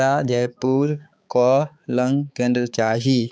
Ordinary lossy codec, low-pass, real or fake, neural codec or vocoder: none; none; fake; codec, 16 kHz, 4 kbps, X-Codec, HuBERT features, trained on balanced general audio